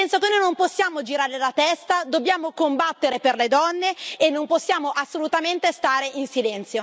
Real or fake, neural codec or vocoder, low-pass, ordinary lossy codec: real; none; none; none